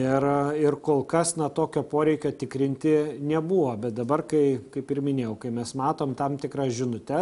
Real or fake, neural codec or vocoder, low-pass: real; none; 10.8 kHz